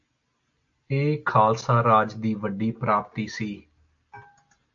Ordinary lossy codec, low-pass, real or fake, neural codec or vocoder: AAC, 64 kbps; 7.2 kHz; real; none